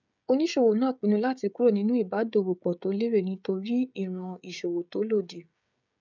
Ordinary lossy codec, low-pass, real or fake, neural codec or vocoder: none; 7.2 kHz; fake; codec, 16 kHz, 16 kbps, FreqCodec, smaller model